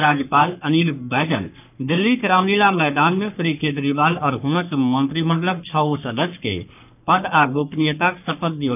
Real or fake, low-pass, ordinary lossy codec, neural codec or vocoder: fake; 3.6 kHz; none; codec, 44.1 kHz, 3.4 kbps, Pupu-Codec